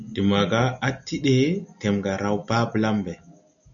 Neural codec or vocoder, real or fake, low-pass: none; real; 7.2 kHz